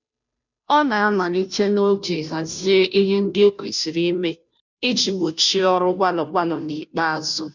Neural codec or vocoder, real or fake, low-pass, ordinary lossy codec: codec, 16 kHz, 0.5 kbps, FunCodec, trained on Chinese and English, 25 frames a second; fake; 7.2 kHz; none